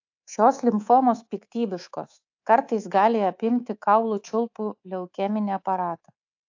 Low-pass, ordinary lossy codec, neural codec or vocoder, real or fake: 7.2 kHz; AAC, 48 kbps; codec, 24 kHz, 3.1 kbps, DualCodec; fake